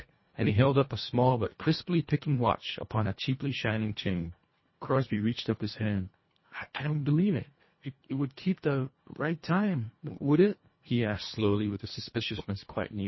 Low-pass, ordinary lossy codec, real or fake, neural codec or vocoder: 7.2 kHz; MP3, 24 kbps; fake; codec, 24 kHz, 1.5 kbps, HILCodec